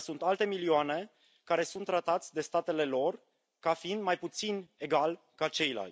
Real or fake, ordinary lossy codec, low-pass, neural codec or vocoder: real; none; none; none